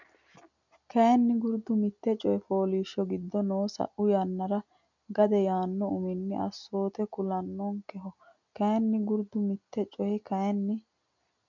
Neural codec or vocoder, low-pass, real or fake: none; 7.2 kHz; real